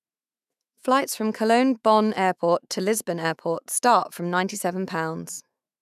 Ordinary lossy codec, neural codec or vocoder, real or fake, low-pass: none; autoencoder, 48 kHz, 128 numbers a frame, DAC-VAE, trained on Japanese speech; fake; 14.4 kHz